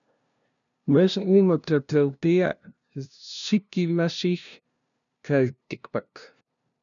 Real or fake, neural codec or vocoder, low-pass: fake; codec, 16 kHz, 0.5 kbps, FunCodec, trained on LibriTTS, 25 frames a second; 7.2 kHz